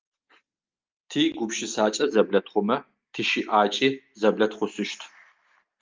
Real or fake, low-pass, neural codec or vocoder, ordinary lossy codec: real; 7.2 kHz; none; Opus, 32 kbps